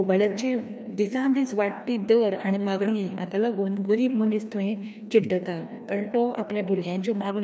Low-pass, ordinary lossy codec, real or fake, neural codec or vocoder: none; none; fake; codec, 16 kHz, 1 kbps, FreqCodec, larger model